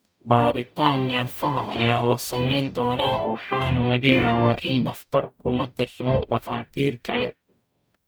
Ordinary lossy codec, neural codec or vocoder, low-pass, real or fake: none; codec, 44.1 kHz, 0.9 kbps, DAC; none; fake